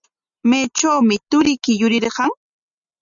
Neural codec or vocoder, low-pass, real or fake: none; 7.2 kHz; real